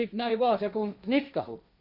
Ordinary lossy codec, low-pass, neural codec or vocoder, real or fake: none; 5.4 kHz; codec, 16 kHz, 1.1 kbps, Voila-Tokenizer; fake